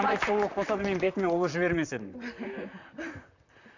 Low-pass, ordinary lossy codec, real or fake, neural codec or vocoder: 7.2 kHz; none; fake; vocoder, 44.1 kHz, 128 mel bands, Pupu-Vocoder